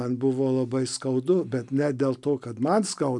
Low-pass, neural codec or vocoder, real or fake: 10.8 kHz; none; real